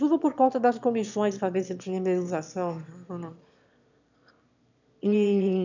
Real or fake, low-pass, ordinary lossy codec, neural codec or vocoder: fake; 7.2 kHz; none; autoencoder, 22.05 kHz, a latent of 192 numbers a frame, VITS, trained on one speaker